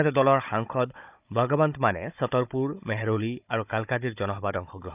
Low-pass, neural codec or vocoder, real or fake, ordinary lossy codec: 3.6 kHz; codec, 16 kHz, 16 kbps, FunCodec, trained on Chinese and English, 50 frames a second; fake; none